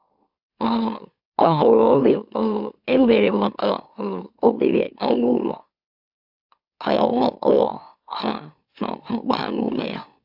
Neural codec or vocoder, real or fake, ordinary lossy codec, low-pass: autoencoder, 44.1 kHz, a latent of 192 numbers a frame, MeloTTS; fake; AAC, 48 kbps; 5.4 kHz